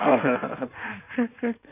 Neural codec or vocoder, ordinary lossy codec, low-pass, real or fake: codec, 16 kHz in and 24 kHz out, 1.1 kbps, FireRedTTS-2 codec; none; 3.6 kHz; fake